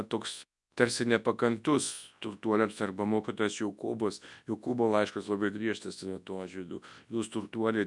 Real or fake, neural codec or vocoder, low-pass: fake; codec, 24 kHz, 0.9 kbps, WavTokenizer, large speech release; 10.8 kHz